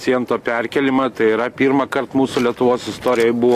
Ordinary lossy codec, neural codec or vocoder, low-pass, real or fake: AAC, 64 kbps; none; 14.4 kHz; real